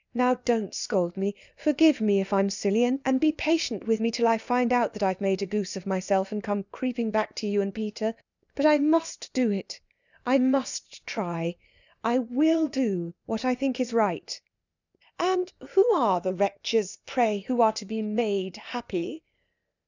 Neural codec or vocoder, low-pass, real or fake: codec, 16 kHz, 0.8 kbps, ZipCodec; 7.2 kHz; fake